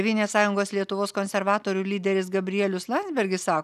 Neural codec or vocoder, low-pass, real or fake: none; 14.4 kHz; real